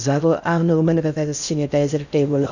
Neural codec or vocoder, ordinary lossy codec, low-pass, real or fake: codec, 16 kHz in and 24 kHz out, 0.6 kbps, FocalCodec, streaming, 2048 codes; none; 7.2 kHz; fake